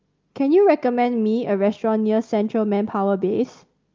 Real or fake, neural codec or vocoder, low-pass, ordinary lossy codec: real; none; 7.2 kHz; Opus, 24 kbps